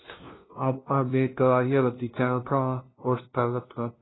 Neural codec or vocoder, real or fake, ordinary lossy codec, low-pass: codec, 16 kHz, 0.5 kbps, FunCodec, trained on LibriTTS, 25 frames a second; fake; AAC, 16 kbps; 7.2 kHz